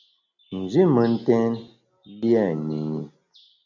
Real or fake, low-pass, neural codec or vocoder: real; 7.2 kHz; none